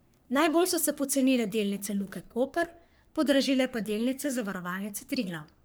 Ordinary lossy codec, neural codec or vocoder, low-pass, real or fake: none; codec, 44.1 kHz, 3.4 kbps, Pupu-Codec; none; fake